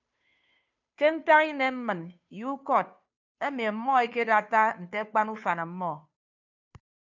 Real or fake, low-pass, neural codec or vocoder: fake; 7.2 kHz; codec, 16 kHz, 2 kbps, FunCodec, trained on Chinese and English, 25 frames a second